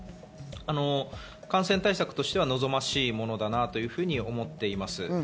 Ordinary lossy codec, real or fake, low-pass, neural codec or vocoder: none; real; none; none